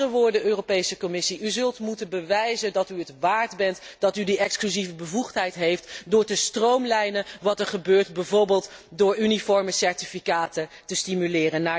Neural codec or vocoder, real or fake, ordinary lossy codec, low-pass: none; real; none; none